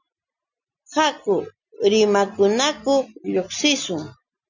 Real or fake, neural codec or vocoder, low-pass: real; none; 7.2 kHz